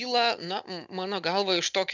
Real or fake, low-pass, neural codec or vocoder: real; 7.2 kHz; none